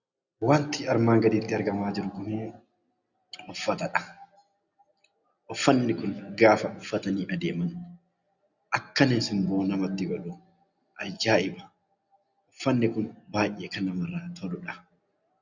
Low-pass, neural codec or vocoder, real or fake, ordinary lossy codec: 7.2 kHz; none; real; Opus, 64 kbps